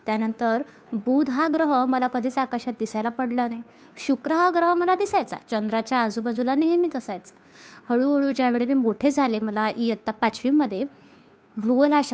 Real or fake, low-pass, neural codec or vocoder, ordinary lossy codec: fake; none; codec, 16 kHz, 2 kbps, FunCodec, trained on Chinese and English, 25 frames a second; none